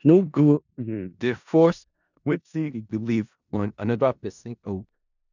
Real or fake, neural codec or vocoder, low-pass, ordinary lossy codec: fake; codec, 16 kHz in and 24 kHz out, 0.4 kbps, LongCat-Audio-Codec, four codebook decoder; 7.2 kHz; none